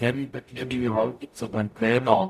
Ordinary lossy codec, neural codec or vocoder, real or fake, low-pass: none; codec, 44.1 kHz, 0.9 kbps, DAC; fake; 14.4 kHz